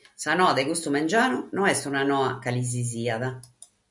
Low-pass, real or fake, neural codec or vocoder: 10.8 kHz; real; none